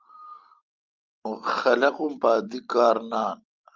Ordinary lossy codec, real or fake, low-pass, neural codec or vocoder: Opus, 32 kbps; real; 7.2 kHz; none